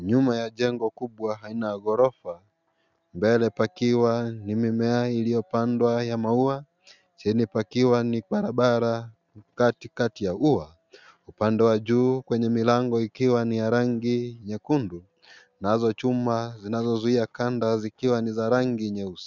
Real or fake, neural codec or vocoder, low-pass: real; none; 7.2 kHz